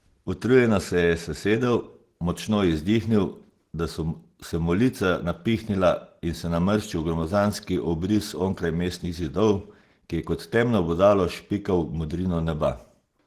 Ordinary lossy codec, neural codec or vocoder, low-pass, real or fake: Opus, 16 kbps; none; 14.4 kHz; real